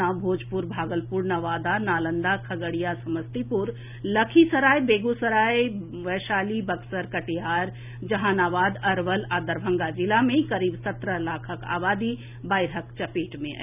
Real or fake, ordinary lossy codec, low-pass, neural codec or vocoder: real; none; 3.6 kHz; none